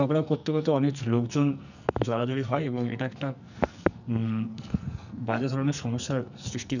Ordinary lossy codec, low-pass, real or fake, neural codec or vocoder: none; 7.2 kHz; fake; codec, 44.1 kHz, 2.6 kbps, SNAC